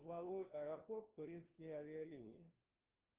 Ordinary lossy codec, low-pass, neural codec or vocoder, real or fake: Opus, 16 kbps; 3.6 kHz; codec, 16 kHz, 1 kbps, FunCodec, trained on LibriTTS, 50 frames a second; fake